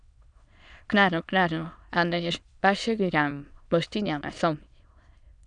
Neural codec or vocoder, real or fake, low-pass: autoencoder, 22.05 kHz, a latent of 192 numbers a frame, VITS, trained on many speakers; fake; 9.9 kHz